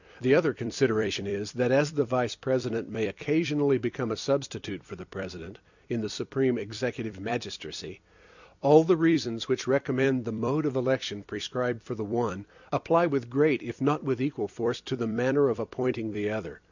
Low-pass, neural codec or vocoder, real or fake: 7.2 kHz; vocoder, 44.1 kHz, 128 mel bands every 256 samples, BigVGAN v2; fake